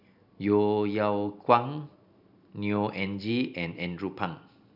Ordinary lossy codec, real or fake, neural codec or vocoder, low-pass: none; real; none; 5.4 kHz